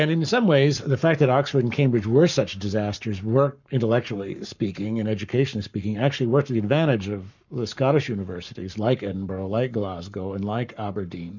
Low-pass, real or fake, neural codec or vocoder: 7.2 kHz; fake; codec, 44.1 kHz, 7.8 kbps, Pupu-Codec